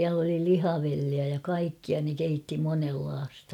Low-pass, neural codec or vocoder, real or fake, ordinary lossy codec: 19.8 kHz; none; real; none